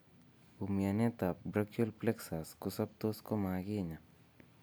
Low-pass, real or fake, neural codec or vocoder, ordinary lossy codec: none; real; none; none